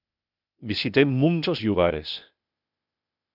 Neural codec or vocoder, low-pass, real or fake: codec, 16 kHz, 0.8 kbps, ZipCodec; 5.4 kHz; fake